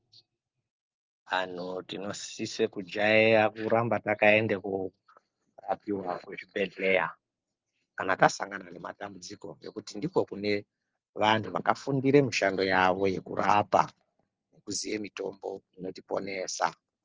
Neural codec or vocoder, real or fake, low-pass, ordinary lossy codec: none; real; 7.2 kHz; Opus, 24 kbps